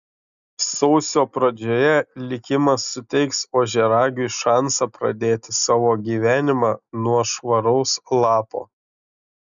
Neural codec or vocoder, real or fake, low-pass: none; real; 7.2 kHz